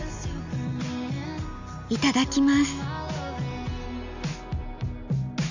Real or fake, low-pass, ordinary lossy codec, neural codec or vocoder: real; 7.2 kHz; Opus, 64 kbps; none